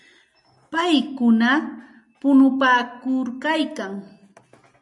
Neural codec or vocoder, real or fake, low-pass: none; real; 10.8 kHz